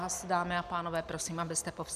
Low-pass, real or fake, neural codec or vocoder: 14.4 kHz; real; none